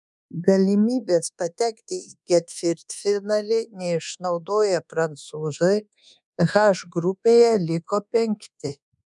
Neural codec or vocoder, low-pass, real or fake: codec, 24 kHz, 1.2 kbps, DualCodec; 10.8 kHz; fake